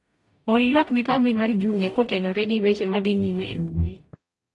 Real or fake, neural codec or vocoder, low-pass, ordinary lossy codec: fake; codec, 44.1 kHz, 0.9 kbps, DAC; 10.8 kHz; AAC, 48 kbps